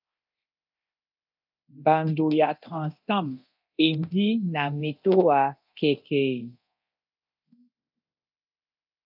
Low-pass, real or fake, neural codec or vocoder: 5.4 kHz; fake; codec, 24 kHz, 0.9 kbps, DualCodec